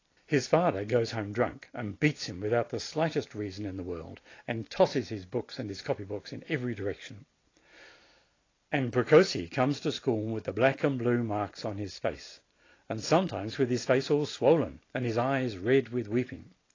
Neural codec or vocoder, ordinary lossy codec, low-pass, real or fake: none; AAC, 32 kbps; 7.2 kHz; real